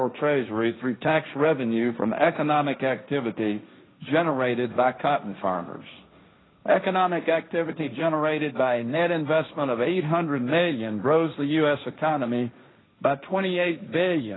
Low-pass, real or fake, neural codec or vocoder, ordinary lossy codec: 7.2 kHz; fake; codec, 16 kHz, 1.1 kbps, Voila-Tokenizer; AAC, 16 kbps